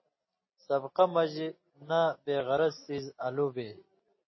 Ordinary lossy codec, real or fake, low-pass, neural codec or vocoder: MP3, 24 kbps; real; 7.2 kHz; none